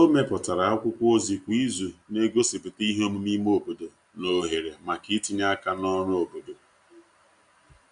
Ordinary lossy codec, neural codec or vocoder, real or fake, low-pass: Opus, 64 kbps; none; real; 9.9 kHz